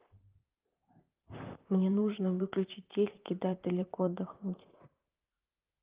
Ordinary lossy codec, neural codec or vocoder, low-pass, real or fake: Opus, 32 kbps; vocoder, 44.1 kHz, 128 mel bands, Pupu-Vocoder; 3.6 kHz; fake